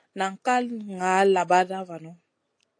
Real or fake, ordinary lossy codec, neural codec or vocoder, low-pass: real; AAC, 48 kbps; none; 9.9 kHz